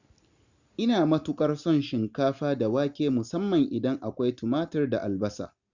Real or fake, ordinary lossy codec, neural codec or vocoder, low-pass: real; Opus, 64 kbps; none; 7.2 kHz